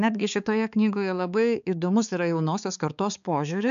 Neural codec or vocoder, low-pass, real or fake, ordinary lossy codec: codec, 16 kHz, 4 kbps, X-Codec, HuBERT features, trained on balanced general audio; 7.2 kHz; fake; AAC, 96 kbps